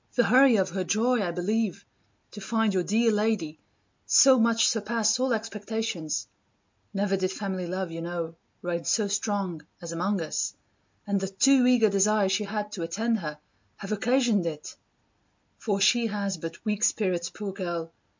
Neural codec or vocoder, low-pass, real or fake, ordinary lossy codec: none; 7.2 kHz; real; MP3, 64 kbps